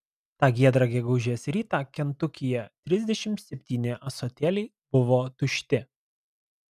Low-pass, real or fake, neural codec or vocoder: 14.4 kHz; real; none